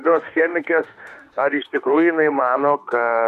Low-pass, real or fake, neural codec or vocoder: 14.4 kHz; fake; autoencoder, 48 kHz, 32 numbers a frame, DAC-VAE, trained on Japanese speech